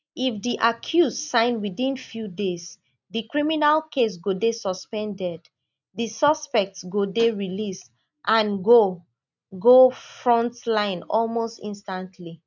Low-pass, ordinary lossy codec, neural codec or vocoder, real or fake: 7.2 kHz; none; none; real